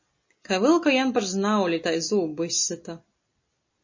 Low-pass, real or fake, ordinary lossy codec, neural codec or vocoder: 7.2 kHz; real; MP3, 32 kbps; none